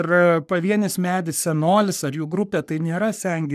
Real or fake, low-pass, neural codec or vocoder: fake; 14.4 kHz; codec, 44.1 kHz, 3.4 kbps, Pupu-Codec